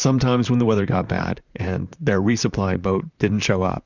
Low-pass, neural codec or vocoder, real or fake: 7.2 kHz; vocoder, 44.1 kHz, 128 mel bands, Pupu-Vocoder; fake